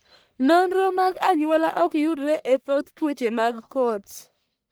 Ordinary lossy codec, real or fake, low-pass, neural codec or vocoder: none; fake; none; codec, 44.1 kHz, 1.7 kbps, Pupu-Codec